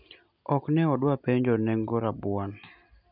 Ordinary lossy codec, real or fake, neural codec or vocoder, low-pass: none; real; none; 5.4 kHz